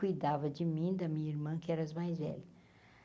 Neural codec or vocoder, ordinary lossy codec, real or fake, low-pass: none; none; real; none